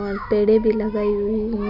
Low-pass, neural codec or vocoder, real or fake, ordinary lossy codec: 5.4 kHz; none; real; Opus, 64 kbps